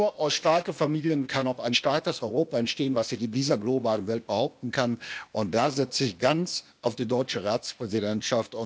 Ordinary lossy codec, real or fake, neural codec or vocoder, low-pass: none; fake; codec, 16 kHz, 0.8 kbps, ZipCodec; none